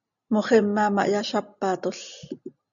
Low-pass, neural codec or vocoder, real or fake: 7.2 kHz; none; real